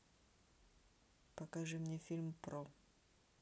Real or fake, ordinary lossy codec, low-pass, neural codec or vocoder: real; none; none; none